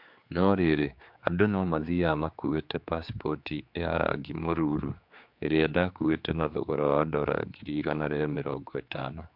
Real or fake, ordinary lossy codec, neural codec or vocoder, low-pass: fake; MP3, 48 kbps; codec, 16 kHz, 4 kbps, X-Codec, HuBERT features, trained on general audio; 5.4 kHz